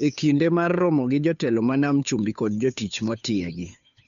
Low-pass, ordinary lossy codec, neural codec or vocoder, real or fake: 7.2 kHz; none; codec, 16 kHz, 2 kbps, FunCodec, trained on Chinese and English, 25 frames a second; fake